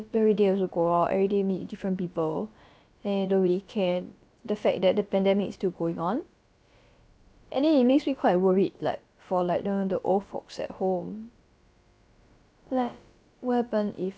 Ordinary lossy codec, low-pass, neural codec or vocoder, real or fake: none; none; codec, 16 kHz, about 1 kbps, DyCAST, with the encoder's durations; fake